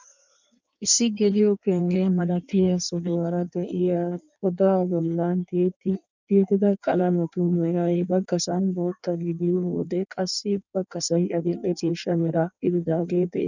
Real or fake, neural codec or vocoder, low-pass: fake; codec, 16 kHz in and 24 kHz out, 1.1 kbps, FireRedTTS-2 codec; 7.2 kHz